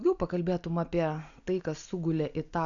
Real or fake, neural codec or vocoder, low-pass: real; none; 7.2 kHz